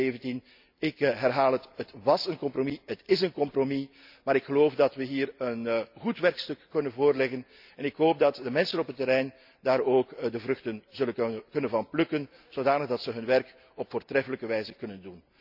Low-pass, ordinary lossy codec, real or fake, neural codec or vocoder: 5.4 kHz; none; real; none